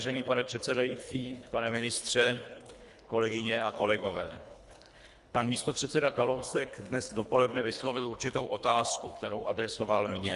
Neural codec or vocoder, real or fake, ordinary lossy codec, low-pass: codec, 24 kHz, 1.5 kbps, HILCodec; fake; Opus, 64 kbps; 10.8 kHz